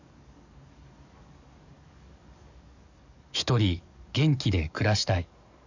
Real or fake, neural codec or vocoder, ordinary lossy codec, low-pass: fake; codec, 44.1 kHz, 7.8 kbps, DAC; none; 7.2 kHz